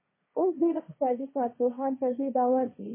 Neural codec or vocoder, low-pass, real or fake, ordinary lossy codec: codec, 16 kHz, 1.1 kbps, Voila-Tokenizer; 3.6 kHz; fake; MP3, 16 kbps